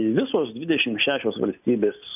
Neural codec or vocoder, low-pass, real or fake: none; 3.6 kHz; real